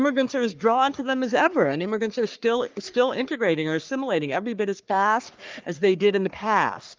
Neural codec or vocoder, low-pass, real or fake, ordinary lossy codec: codec, 44.1 kHz, 3.4 kbps, Pupu-Codec; 7.2 kHz; fake; Opus, 32 kbps